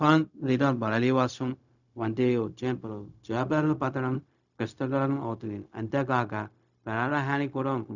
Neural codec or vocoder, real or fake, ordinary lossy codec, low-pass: codec, 16 kHz, 0.4 kbps, LongCat-Audio-Codec; fake; none; 7.2 kHz